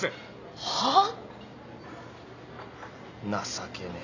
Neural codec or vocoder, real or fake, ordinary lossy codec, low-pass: none; real; none; 7.2 kHz